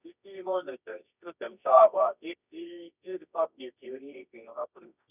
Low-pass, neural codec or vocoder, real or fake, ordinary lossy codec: 3.6 kHz; codec, 24 kHz, 0.9 kbps, WavTokenizer, medium music audio release; fake; none